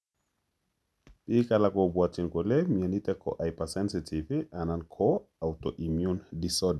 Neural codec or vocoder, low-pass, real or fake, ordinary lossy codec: none; none; real; none